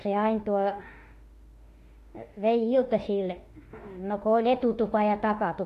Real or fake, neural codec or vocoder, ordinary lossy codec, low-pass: fake; autoencoder, 48 kHz, 32 numbers a frame, DAC-VAE, trained on Japanese speech; AAC, 48 kbps; 14.4 kHz